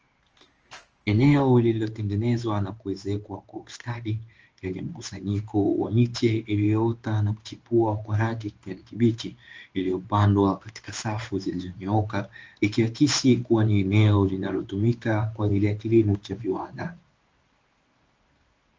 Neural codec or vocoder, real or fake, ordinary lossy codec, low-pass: codec, 16 kHz in and 24 kHz out, 1 kbps, XY-Tokenizer; fake; Opus, 24 kbps; 7.2 kHz